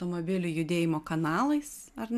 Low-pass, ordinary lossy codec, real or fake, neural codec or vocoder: 14.4 kHz; MP3, 96 kbps; real; none